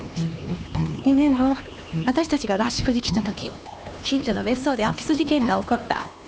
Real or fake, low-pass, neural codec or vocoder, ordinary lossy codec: fake; none; codec, 16 kHz, 2 kbps, X-Codec, HuBERT features, trained on LibriSpeech; none